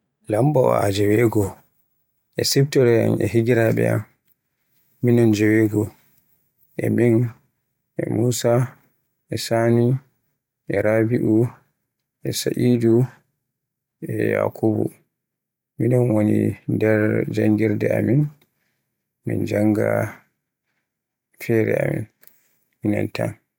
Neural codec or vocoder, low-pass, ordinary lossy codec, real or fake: vocoder, 44.1 kHz, 128 mel bands every 512 samples, BigVGAN v2; 19.8 kHz; none; fake